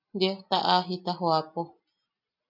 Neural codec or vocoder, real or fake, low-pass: none; real; 5.4 kHz